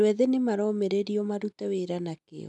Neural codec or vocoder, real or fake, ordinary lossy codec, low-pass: none; real; none; 10.8 kHz